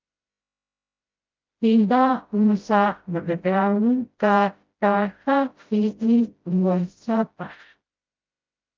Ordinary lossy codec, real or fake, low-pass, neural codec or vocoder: Opus, 24 kbps; fake; 7.2 kHz; codec, 16 kHz, 0.5 kbps, FreqCodec, smaller model